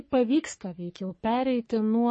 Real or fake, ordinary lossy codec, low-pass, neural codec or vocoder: fake; MP3, 32 kbps; 10.8 kHz; codec, 44.1 kHz, 3.4 kbps, Pupu-Codec